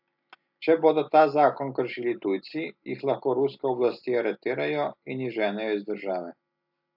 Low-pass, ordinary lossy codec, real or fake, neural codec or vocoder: 5.4 kHz; AAC, 48 kbps; real; none